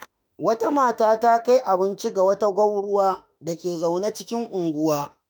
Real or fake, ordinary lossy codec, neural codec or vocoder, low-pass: fake; none; autoencoder, 48 kHz, 32 numbers a frame, DAC-VAE, trained on Japanese speech; none